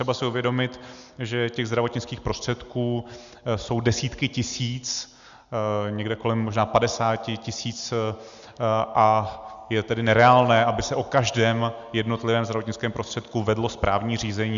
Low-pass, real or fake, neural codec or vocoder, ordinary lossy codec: 7.2 kHz; real; none; Opus, 64 kbps